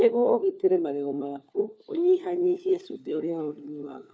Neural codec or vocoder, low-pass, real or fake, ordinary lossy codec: codec, 16 kHz, 4 kbps, FunCodec, trained on LibriTTS, 50 frames a second; none; fake; none